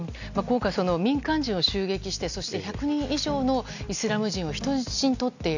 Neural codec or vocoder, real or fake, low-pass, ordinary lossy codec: none; real; 7.2 kHz; none